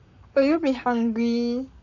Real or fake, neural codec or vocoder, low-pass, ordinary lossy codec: fake; codec, 44.1 kHz, 7.8 kbps, Pupu-Codec; 7.2 kHz; none